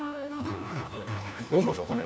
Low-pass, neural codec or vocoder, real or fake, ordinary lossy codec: none; codec, 16 kHz, 1 kbps, FunCodec, trained on LibriTTS, 50 frames a second; fake; none